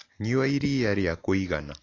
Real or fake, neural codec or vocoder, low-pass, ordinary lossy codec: real; none; 7.2 kHz; AAC, 32 kbps